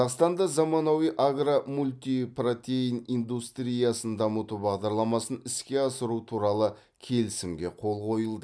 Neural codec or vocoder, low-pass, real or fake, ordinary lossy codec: none; none; real; none